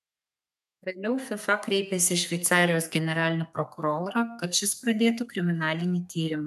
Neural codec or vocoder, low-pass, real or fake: codec, 32 kHz, 1.9 kbps, SNAC; 14.4 kHz; fake